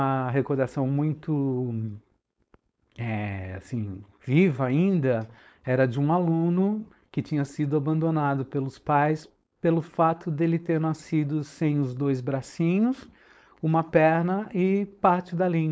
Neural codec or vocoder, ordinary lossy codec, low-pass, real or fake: codec, 16 kHz, 4.8 kbps, FACodec; none; none; fake